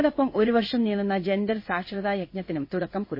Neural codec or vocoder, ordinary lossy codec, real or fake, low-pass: codec, 16 kHz in and 24 kHz out, 1 kbps, XY-Tokenizer; MP3, 24 kbps; fake; 5.4 kHz